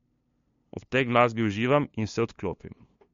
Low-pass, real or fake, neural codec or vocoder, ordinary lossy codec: 7.2 kHz; fake; codec, 16 kHz, 2 kbps, FunCodec, trained on LibriTTS, 25 frames a second; MP3, 48 kbps